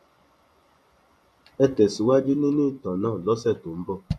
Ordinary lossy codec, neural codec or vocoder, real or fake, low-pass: none; vocoder, 24 kHz, 100 mel bands, Vocos; fake; none